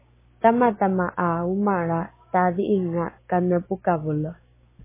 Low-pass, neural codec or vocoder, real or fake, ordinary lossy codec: 3.6 kHz; codec, 16 kHz, 6 kbps, DAC; fake; MP3, 16 kbps